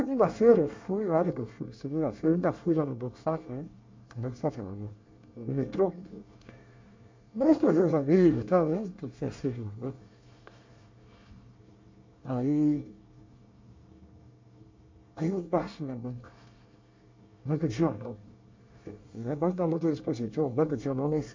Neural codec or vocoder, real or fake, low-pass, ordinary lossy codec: codec, 24 kHz, 1 kbps, SNAC; fake; 7.2 kHz; MP3, 48 kbps